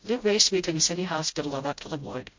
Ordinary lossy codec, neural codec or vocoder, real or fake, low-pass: MP3, 48 kbps; codec, 16 kHz, 0.5 kbps, FreqCodec, smaller model; fake; 7.2 kHz